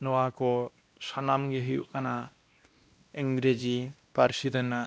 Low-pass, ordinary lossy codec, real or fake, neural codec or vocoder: none; none; fake; codec, 16 kHz, 1 kbps, X-Codec, WavLM features, trained on Multilingual LibriSpeech